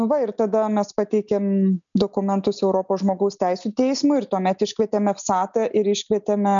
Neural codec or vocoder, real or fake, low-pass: none; real; 7.2 kHz